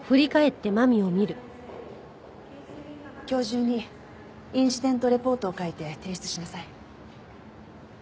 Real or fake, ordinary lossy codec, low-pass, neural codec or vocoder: real; none; none; none